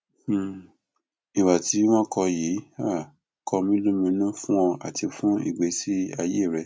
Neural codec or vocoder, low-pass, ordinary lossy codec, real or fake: none; none; none; real